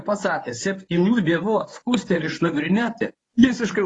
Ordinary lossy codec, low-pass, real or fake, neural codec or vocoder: AAC, 32 kbps; 10.8 kHz; fake; codec, 24 kHz, 0.9 kbps, WavTokenizer, medium speech release version 1